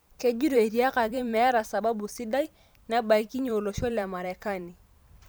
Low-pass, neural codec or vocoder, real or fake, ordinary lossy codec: none; vocoder, 44.1 kHz, 128 mel bands every 512 samples, BigVGAN v2; fake; none